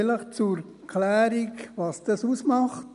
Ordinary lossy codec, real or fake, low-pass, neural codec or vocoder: none; real; 10.8 kHz; none